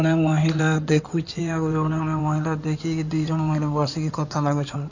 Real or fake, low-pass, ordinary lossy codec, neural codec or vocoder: fake; 7.2 kHz; none; codec, 16 kHz in and 24 kHz out, 2.2 kbps, FireRedTTS-2 codec